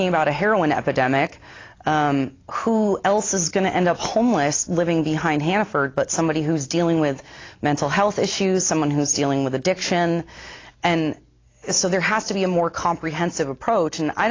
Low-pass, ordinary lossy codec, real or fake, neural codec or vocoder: 7.2 kHz; AAC, 32 kbps; real; none